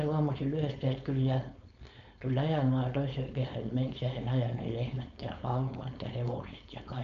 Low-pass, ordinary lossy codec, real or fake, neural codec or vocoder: 7.2 kHz; none; fake; codec, 16 kHz, 4.8 kbps, FACodec